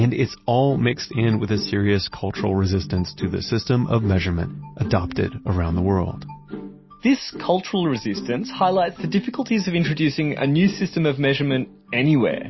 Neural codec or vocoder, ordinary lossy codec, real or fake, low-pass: none; MP3, 24 kbps; real; 7.2 kHz